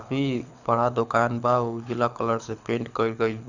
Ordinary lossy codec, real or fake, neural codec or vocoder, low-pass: none; fake; codec, 24 kHz, 6 kbps, HILCodec; 7.2 kHz